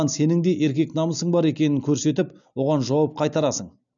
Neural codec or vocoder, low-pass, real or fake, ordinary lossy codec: none; 7.2 kHz; real; MP3, 96 kbps